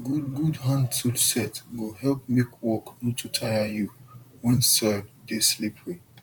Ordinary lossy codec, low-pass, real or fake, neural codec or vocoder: none; 19.8 kHz; fake; vocoder, 44.1 kHz, 128 mel bands, Pupu-Vocoder